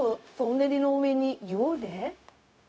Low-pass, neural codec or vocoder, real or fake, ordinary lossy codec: none; codec, 16 kHz, 0.4 kbps, LongCat-Audio-Codec; fake; none